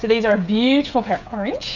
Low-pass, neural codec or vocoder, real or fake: 7.2 kHz; codec, 16 kHz, 16 kbps, FreqCodec, smaller model; fake